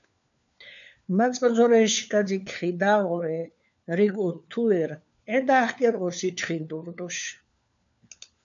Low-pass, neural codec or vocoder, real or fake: 7.2 kHz; codec, 16 kHz, 4 kbps, FunCodec, trained on LibriTTS, 50 frames a second; fake